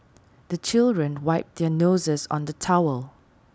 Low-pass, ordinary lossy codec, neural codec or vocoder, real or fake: none; none; none; real